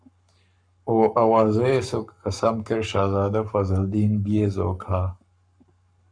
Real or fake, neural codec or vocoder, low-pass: fake; codec, 44.1 kHz, 7.8 kbps, Pupu-Codec; 9.9 kHz